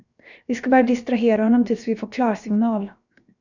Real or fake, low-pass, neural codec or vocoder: fake; 7.2 kHz; codec, 16 kHz, 0.7 kbps, FocalCodec